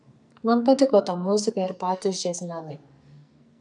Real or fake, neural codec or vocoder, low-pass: fake; codec, 44.1 kHz, 2.6 kbps, SNAC; 10.8 kHz